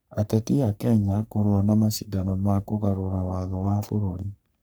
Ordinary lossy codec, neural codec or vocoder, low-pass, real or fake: none; codec, 44.1 kHz, 3.4 kbps, Pupu-Codec; none; fake